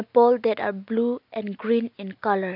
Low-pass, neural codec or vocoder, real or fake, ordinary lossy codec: 5.4 kHz; none; real; none